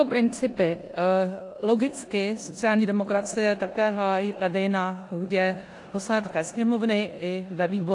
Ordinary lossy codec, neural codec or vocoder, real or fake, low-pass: AAC, 48 kbps; codec, 16 kHz in and 24 kHz out, 0.9 kbps, LongCat-Audio-Codec, four codebook decoder; fake; 10.8 kHz